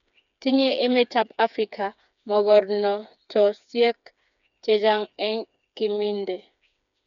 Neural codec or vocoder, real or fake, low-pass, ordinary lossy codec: codec, 16 kHz, 4 kbps, FreqCodec, smaller model; fake; 7.2 kHz; none